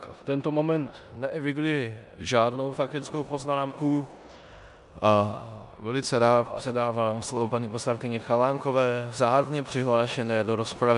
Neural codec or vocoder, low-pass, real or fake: codec, 16 kHz in and 24 kHz out, 0.9 kbps, LongCat-Audio-Codec, four codebook decoder; 10.8 kHz; fake